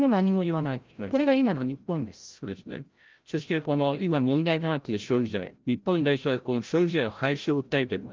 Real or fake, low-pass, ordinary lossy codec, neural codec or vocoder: fake; 7.2 kHz; Opus, 32 kbps; codec, 16 kHz, 0.5 kbps, FreqCodec, larger model